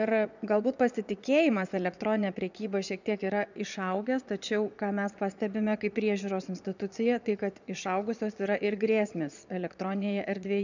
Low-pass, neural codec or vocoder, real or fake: 7.2 kHz; vocoder, 22.05 kHz, 80 mel bands, Vocos; fake